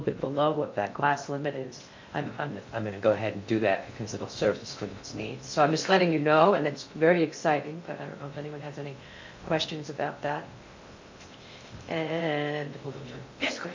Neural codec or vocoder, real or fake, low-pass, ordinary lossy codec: codec, 16 kHz in and 24 kHz out, 0.6 kbps, FocalCodec, streaming, 2048 codes; fake; 7.2 kHz; MP3, 48 kbps